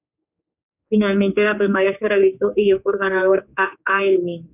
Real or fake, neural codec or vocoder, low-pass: fake; codec, 16 kHz, 4 kbps, X-Codec, HuBERT features, trained on general audio; 3.6 kHz